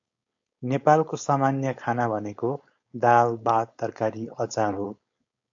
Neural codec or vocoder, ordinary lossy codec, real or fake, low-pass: codec, 16 kHz, 4.8 kbps, FACodec; AAC, 64 kbps; fake; 7.2 kHz